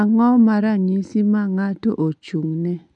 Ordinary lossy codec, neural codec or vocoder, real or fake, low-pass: none; none; real; 10.8 kHz